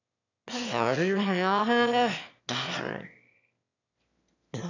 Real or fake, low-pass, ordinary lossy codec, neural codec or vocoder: fake; 7.2 kHz; none; autoencoder, 22.05 kHz, a latent of 192 numbers a frame, VITS, trained on one speaker